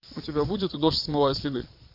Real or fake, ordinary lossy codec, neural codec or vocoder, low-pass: fake; MP3, 32 kbps; vocoder, 22.05 kHz, 80 mel bands, Vocos; 5.4 kHz